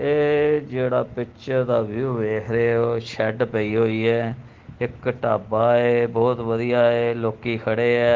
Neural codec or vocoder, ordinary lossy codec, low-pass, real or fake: none; Opus, 16 kbps; 7.2 kHz; real